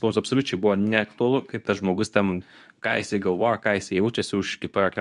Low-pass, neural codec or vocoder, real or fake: 10.8 kHz; codec, 24 kHz, 0.9 kbps, WavTokenizer, medium speech release version 2; fake